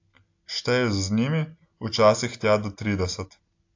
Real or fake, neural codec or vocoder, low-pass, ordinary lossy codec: real; none; 7.2 kHz; AAC, 48 kbps